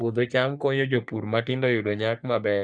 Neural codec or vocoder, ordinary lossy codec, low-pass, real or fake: codec, 44.1 kHz, 3.4 kbps, Pupu-Codec; none; 9.9 kHz; fake